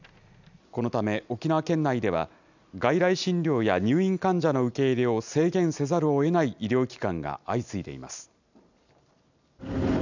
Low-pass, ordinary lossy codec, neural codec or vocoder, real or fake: 7.2 kHz; none; none; real